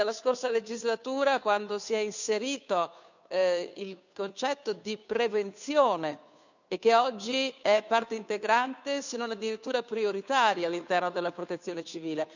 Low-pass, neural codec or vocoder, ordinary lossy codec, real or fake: 7.2 kHz; codec, 16 kHz, 2 kbps, FunCodec, trained on Chinese and English, 25 frames a second; none; fake